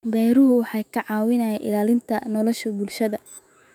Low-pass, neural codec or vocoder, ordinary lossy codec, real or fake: 19.8 kHz; autoencoder, 48 kHz, 128 numbers a frame, DAC-VAE, trained on Japanese speech; none; fake